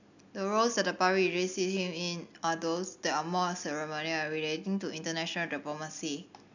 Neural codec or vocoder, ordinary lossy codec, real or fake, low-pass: none; none; real; 7.2 kHz